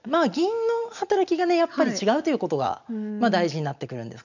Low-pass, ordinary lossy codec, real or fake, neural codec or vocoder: 7.2 kHz; none; real; none